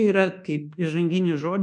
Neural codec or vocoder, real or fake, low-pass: codec, 24 kHz, 1.2 kbps, DualCodec; fake; 10.8 kHz